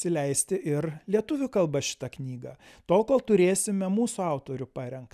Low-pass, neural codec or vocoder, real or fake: 14.4 kHz; none; real